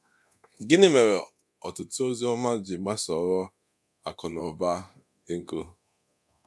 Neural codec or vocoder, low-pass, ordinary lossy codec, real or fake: codec, 24 kHz, 0.9 kbps, DualCodec; none; none; fake